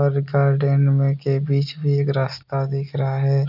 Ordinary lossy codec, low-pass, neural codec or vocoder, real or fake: none; 5.4 kHz; none; real